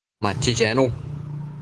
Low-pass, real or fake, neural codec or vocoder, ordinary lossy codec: 10.8 kHz; fake; codec, 24 kHz, 3.1 kbps, DualCodec; Opus, 16 kbps